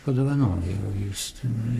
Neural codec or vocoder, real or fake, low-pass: codec, 44.1 kHz, 3.4 kbps, Pupu-Codec; fake; 14.4 kHz